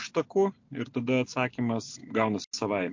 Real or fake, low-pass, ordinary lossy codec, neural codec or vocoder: real; 7.2 kHz; MP3, 48 kbps; none